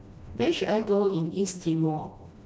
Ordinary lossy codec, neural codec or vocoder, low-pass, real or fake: none; codec, 16 kHz, 1 kbps, FreqCodec, smaller model; none; fake